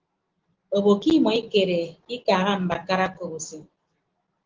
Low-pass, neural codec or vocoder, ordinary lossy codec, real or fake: 7.2 kHz; none; Opus, 16 kbps; real